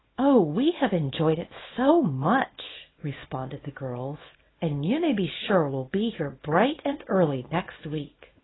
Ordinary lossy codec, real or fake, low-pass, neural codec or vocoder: AAC, 16 kbps; fake; 7.2 kHz; codec, 24 kHz, 0.9 kbps, WavTokenizer, small release